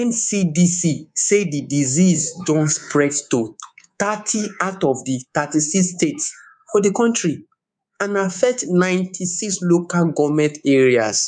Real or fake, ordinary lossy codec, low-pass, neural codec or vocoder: fake; none; 9.9 kHz; codec, 24 kHz, 3.1 kbps, DualCodec